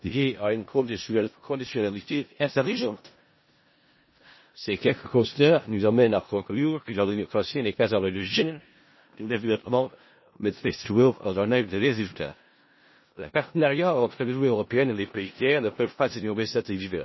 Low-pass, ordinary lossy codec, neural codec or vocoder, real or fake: 7.2 kHz; MP3, 24 kbps; codec, 16 kHz in and 24 kHz out, 0.4 kbps, LongCat-Audio-Codec, four codebook decoder; fake